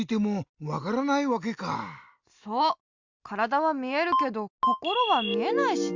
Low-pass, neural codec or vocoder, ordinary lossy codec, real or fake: 7.2 kHz; none; Opus, 64 kbps; real